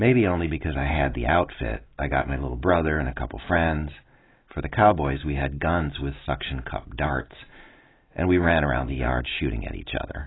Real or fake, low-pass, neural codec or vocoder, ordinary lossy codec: real; 7.2 kHz; none; AAC, 16 kbps